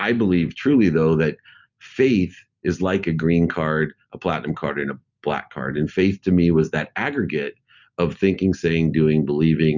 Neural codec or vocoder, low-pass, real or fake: none; 7.2 kHz; real